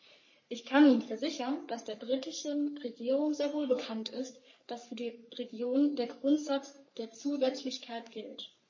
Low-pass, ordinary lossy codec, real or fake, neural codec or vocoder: 7.2 kHz; MP3, 32 kbps; fake; codec, 44.1 kHz, 3.4 kbps, Pupu-Codec